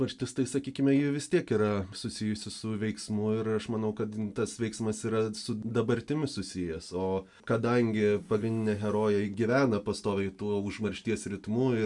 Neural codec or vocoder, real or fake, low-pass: none; real; 10.8 kHz